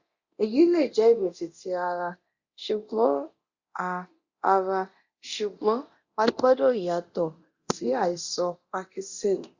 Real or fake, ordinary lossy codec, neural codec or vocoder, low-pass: fake; Opus, 64 kbps; codec, 24 kHz, 0.9 kbps, DualCodec; 7.2 kHz